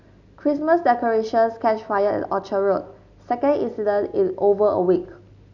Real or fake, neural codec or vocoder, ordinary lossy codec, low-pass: real; none; none; 7.2 kHz